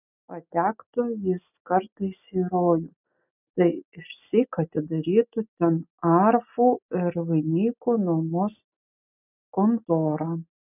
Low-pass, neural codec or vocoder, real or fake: 3.6 kHz; none; real